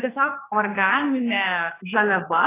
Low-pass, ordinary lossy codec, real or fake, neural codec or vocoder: 3.6 kHz; AAC, 16 kbps; fake; codec, 44.1 kHz, 2.6 kbps, SNAC